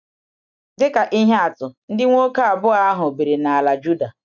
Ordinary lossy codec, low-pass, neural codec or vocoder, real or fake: none; 7.2 kHz; none; real